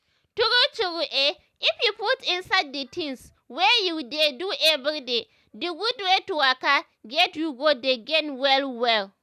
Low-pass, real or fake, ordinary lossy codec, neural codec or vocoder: 14.4 kHz; real; none; none